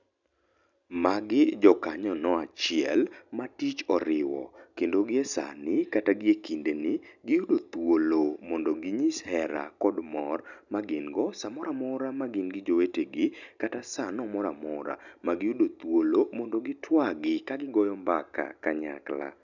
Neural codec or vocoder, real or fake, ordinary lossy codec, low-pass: none; real; none; 7.2 kHz